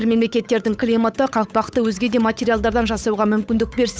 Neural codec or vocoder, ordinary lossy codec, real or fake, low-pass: codec, 16 kHz, 8 kbps, FunCodec, trained on Chinese and English, 25 frames a second; none; fake; none